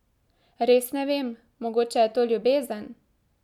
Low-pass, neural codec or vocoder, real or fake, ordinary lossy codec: 19.8 kHz; none; real; none